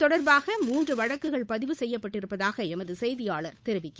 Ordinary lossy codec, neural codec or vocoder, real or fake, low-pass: none; codec, 16 kHz, 8 kbps, FunCodec, trained on Chinese and English, 25 frames a second; fake; none